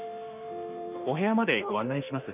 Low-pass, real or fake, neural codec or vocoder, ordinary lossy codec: 3.6 kHz; fake; autoencoder, 48 kHz, 32 numbers a frame, DAC-VAE, trained on Japanese speech; none